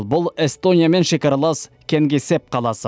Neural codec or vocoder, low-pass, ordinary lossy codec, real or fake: none; none; none; real